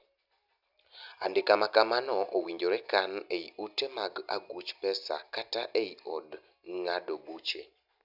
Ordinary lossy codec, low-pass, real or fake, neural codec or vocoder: none; 5.4 kHz; real; none